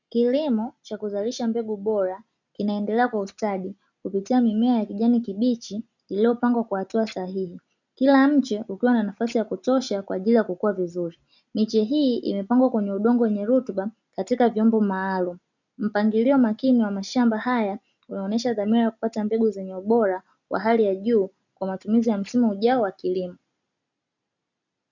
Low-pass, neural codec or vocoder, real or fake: 7.2 kHz; none; real